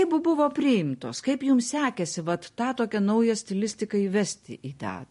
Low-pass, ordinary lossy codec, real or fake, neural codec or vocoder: 10.8 kHz; MP3, 48 kbps; real; none